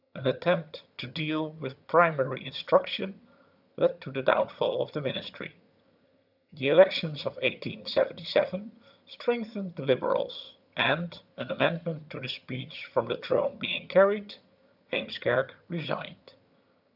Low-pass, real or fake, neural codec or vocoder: 5.4 kHz; fake; vocoder, 22.05 kHz, 80 mel bands, HiFi-GAN